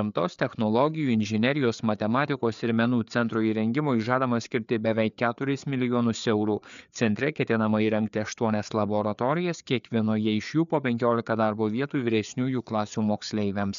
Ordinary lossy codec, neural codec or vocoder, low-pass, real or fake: MP3, 96 kbps; codec, 16 kHz, 4 kbps, FreqCodec, larger model; 7.2 kHz; fake